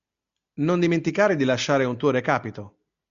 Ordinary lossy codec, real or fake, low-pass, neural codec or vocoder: MP3, 64 kbps; real; 7.2 kHz; none